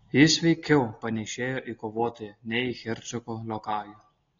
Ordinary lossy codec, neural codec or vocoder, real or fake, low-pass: AAC, 32 kbps; none; real; 7.2 kHz